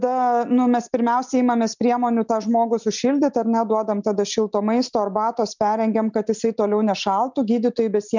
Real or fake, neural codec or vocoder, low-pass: real; none; 7.2 kHz